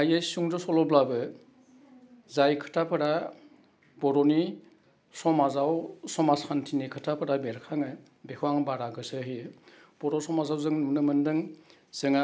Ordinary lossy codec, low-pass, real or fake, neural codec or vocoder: none; none; real; none